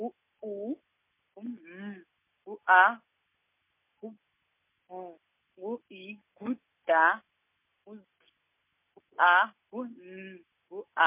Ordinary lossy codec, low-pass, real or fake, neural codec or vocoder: MP3, 24 kbps; 3.6 kHz; real; none